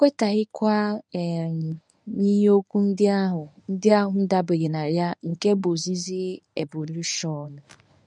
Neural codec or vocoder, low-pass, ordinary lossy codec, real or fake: codec, 24 kHz, 0.9 kbps, WavTokenizer, medium speech release version 1; none; none; fake